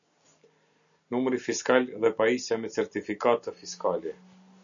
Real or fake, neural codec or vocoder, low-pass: real; none; 7.2 kHz